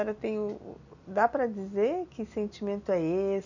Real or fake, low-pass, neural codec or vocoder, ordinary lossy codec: real; 7.2 kHz; none; none